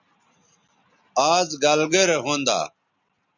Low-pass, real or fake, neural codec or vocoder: 7.2 kHz; real; none